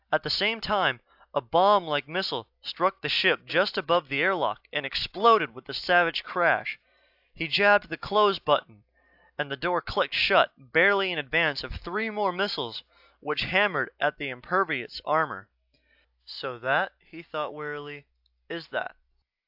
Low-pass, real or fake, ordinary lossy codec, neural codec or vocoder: 5.4 kHz; real; AAC, 48 kbps; none